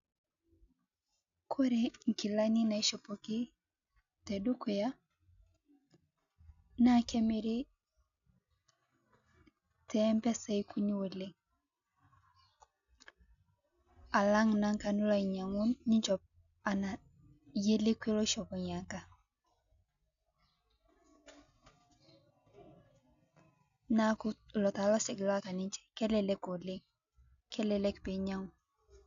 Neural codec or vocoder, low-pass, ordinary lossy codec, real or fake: none; 7.2 kHz; none; real